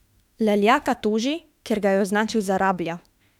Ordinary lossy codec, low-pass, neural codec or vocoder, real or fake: none; 19.8 kHz; autoencoder, 48 kHz, 32 numbers a frame, DAC-VAE, trained on Japanese speech; fake